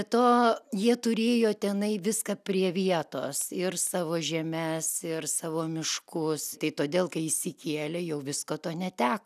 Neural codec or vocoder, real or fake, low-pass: none; real; 14.4 kHz